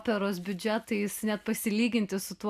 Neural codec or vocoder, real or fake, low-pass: none; real; 14.4 kHz